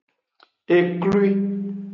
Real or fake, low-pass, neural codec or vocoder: real; 7.2 kHz; none